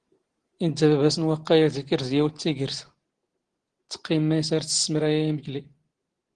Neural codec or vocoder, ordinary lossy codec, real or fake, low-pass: none; Opus, 16 kbps; real; 9.9 kHz